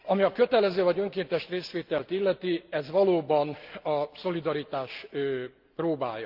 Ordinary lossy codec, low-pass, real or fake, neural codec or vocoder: Opus, 32 kbps; 5.4 kHz; real; none